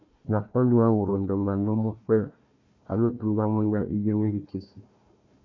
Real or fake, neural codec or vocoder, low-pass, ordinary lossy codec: fake; codec, 16 kHz, 1 kbps, FunCodec, trained on Chinese and English, 50 frames a second; 7.2 kHz; MP3, 48 kbps